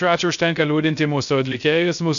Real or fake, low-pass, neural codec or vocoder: fake; 7.2 kHz; codec, 16 kHz, 0.3 kbps, FocalCodec